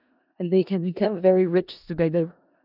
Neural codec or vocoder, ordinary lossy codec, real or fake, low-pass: codec, 16 kHz in and 24 kHz out, 0.4 kbps, LongCat-Audio-Codec, four codebook decoder; none; fake; 5.4 kHz